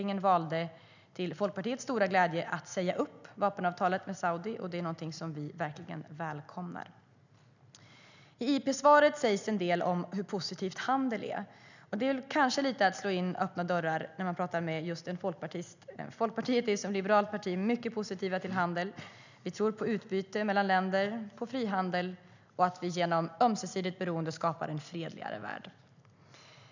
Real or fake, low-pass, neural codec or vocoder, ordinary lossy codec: real; 7.2 kHz; none; none